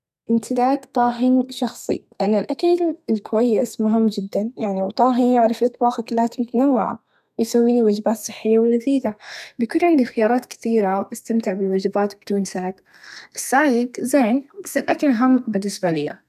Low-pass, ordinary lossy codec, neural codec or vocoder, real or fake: 14.4 kHz; none; codec, 32 kHz, 1.9 kbps, SNAC; fake